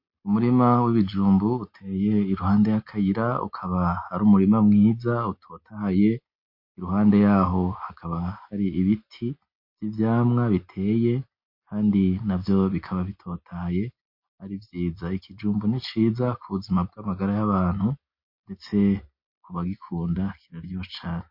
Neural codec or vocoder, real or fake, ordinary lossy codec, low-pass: none; real; MP3, 32 kbps; 5.4 kHz